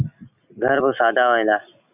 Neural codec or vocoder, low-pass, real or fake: none; 3.6 kHz; real